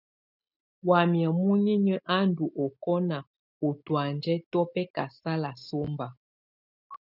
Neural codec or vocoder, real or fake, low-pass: none; real; 5.4 kHz